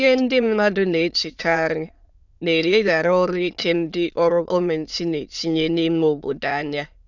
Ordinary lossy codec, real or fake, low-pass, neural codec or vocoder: none; fake; 7.2 kHz; autoencoder, 22.05 kHz, a latent of 192 numbers a frame, VITS, trained on many speakers